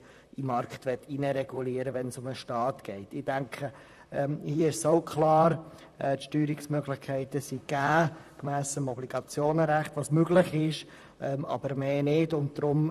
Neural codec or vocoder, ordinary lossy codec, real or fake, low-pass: vocoder, 44.1 kHz, 128 mel bands, Pupu-Vocoder; none; fake; 14.4 kHz